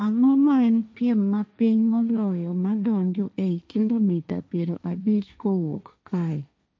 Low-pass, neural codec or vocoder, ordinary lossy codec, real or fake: none; codec, 16 kHz, 1.1 kbps, Voila-Tokenizer; none; fake